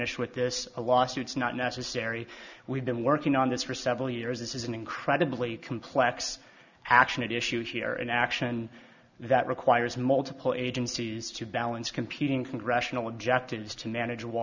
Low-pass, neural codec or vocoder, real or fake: 7.2 kHz; none; real